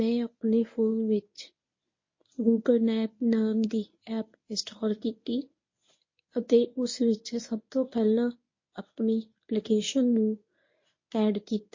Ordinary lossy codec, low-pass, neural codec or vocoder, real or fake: MP3, 32 kbps; 7.2 kHz; codec, 24 kHz, 0.9 kbps, WavTokenizer, medium speech release version 1; fake